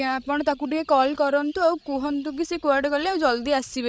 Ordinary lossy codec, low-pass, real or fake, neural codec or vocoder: none; none; fake; codec, 16 kHz, 16 kbps, FreqCodec, larger model